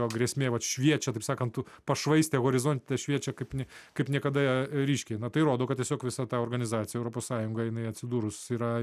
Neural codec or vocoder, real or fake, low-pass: none; real; 14.4 kHz